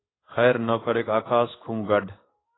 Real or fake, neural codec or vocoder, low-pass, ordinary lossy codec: fake; codec, 16 kHz in and 24 kHz out, 1 kbps, XY-Tokenizer; 7.2 kHz; AAC, 16 kbps